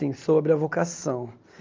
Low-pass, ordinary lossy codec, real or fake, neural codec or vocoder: 7.2 kHz; Opus, 32 kbps; real; none